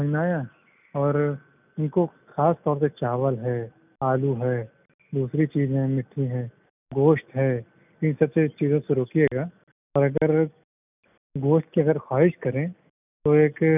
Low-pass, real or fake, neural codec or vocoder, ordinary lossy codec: 3.6 kHz; real; none; none